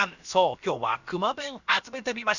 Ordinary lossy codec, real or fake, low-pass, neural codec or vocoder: none; fake; 7.2 kHz; codec, 16 kHz, about 1 kbps, DyCAST, with the encoder's durations